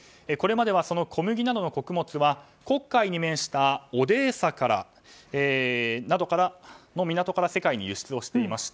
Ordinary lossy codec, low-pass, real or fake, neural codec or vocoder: none; none; real; none